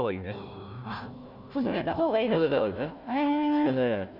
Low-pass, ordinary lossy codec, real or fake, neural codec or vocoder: 5.4 kHz; none; fake; codec, 16 kHz, 1 kbps, FunCodec, trained on Chinese and English, 50 frames a second